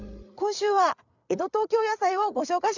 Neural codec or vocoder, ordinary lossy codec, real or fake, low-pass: codec, 16 kHz, 8 kbps, FreqCodec, larger model; none; fake; 7.2 kHz